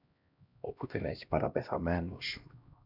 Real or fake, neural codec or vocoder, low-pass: fake; codec, 16 kHz, 1 kbps, X-Codec, HuBERT features, trained on LibriSpeech; 5.4 kHz